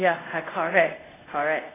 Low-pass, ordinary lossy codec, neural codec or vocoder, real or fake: 3.6 kHz; AAC, 16 kbps; codec, 24 kHz, 0.5 kbps, DualCodec; fake